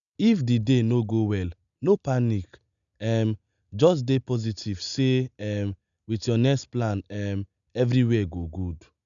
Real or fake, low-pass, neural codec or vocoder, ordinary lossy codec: real; 7.2 kHz; none; none